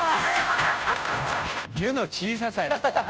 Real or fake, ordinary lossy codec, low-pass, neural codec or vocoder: fake; none; none; codec, 16 kHz, 0.5 kbps, FunCodec, trained on Chinese and English, 25 frames a second